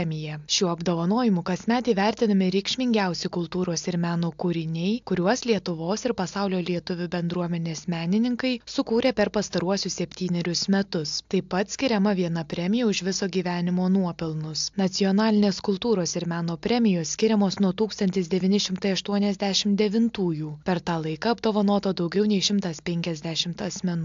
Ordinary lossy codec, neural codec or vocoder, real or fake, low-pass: AAC, 64 kbps; none; real; 7.2 kHz